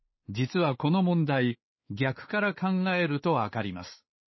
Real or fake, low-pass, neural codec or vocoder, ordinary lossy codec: fake; 7.2 kHz; codec, 16 kHz, 4 kbps, X-Codec, WavLM features, trained on Multilingual LibriSpeech; MP3, 24 kbps